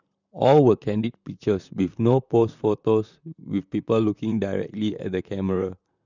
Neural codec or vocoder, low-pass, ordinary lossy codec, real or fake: vocoder, 44.1 kHz, 128 mel bands, Pupu-Vocoder; 7.2 kHz; none; fake